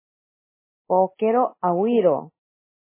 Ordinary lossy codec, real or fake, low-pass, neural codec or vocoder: MP3, 16 kbps; real; 3.6 kHz; none